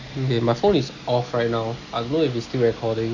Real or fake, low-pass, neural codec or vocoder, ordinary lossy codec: real; 7.2 kHz; none; none